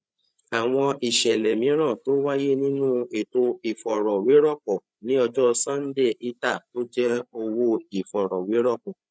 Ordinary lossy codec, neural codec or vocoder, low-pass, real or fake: none; codec, 16 kHz, 8 kbps, FreqCodec, larger model; none; fake